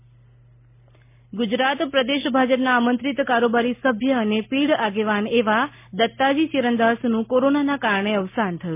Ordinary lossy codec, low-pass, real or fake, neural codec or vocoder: MP3, 24 kbps; 3.6 kHz; real; none